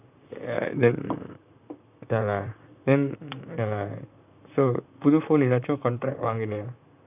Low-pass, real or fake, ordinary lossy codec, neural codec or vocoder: 3.6 kHz; fake; none; vocoder, 44.1 kHz, 128 mel bands, Pupu-Vocoder